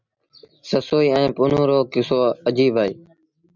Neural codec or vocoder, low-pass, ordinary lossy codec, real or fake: none; 7.2 kHz; MP3, 64 kbps; real